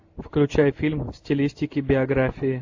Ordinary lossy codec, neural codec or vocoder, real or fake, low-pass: AAC, 48 kbps; none; real; 7.2 kHz